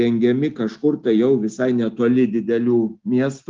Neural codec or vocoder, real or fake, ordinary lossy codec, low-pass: none; real; Opus, 32 kbps; 7.2 kHz